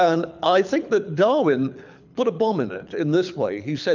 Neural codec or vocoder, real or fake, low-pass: codec, 24 kHz, 6 kbps, HILCodec; fake; 7.2 kHz